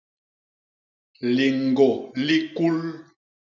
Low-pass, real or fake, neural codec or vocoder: 7.2 kHz; real; none